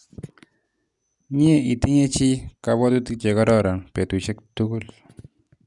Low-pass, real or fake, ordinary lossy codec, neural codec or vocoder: 10.8 kHz; real; none; none